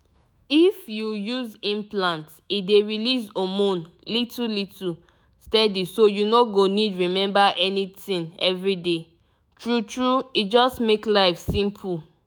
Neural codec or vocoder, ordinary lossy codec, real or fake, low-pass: autoencoder, 48 kHz, 128 numbers a frame, DAC-VAE, trained on Japanese speech; none; fake; none